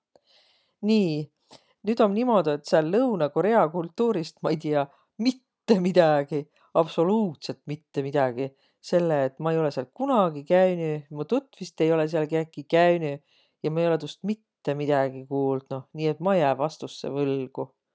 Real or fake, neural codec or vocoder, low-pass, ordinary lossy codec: real; none; none; none